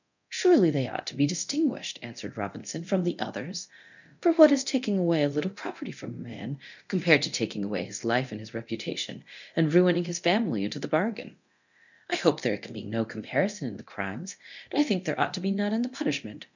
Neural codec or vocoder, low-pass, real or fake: codec, 24 kHz, 0.9 kbps, DualCodec; 7.2 kHz; fake